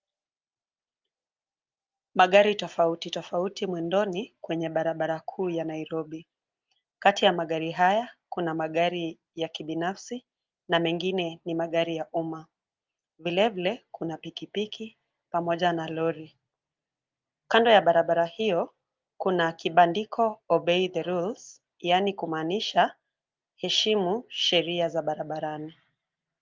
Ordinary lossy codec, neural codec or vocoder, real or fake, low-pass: Opus, 32 kbps; none; real; 7.2 kHz